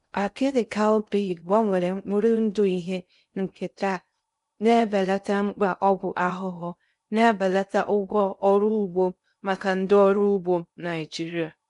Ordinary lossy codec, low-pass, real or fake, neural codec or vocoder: MP3, 96 kbps; 10.8 kHz; fake; codec, 16 kHz in and 24 kHz out, 0.6 kbps, FocalCodec, streaming, 2048 codes